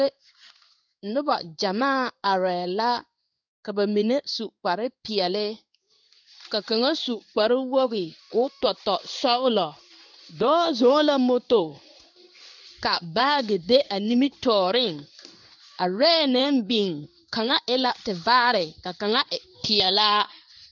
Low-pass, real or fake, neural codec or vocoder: 7.2 kHz; fake; codec, 16 kHz in and 24 kHz out, 1 kbps, XY-Tokenizer